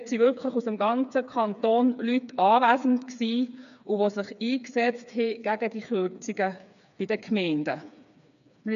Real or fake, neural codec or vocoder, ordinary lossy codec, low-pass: fake; codec, 16 kHz, 4 kbps, FreqCodec, smaller model; none; 7.2 kHz